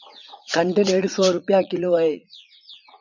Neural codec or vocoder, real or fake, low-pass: vocoder, 44.1 kHz, 80 mel bands, Vocos; fake; 7.2 kHz